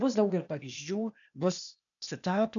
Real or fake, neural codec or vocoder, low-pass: fake; codec, 16 kHz, 0.8 kbps, ZipCodec; 7.2 kHz